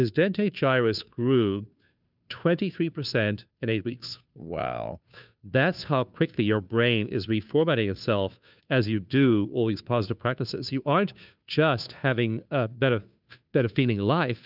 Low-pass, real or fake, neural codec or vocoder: 5.4 kHz; fake; codec, 16 kHz, 2 kbps, FunCodec, trained on Chinese and English, 25 frames a second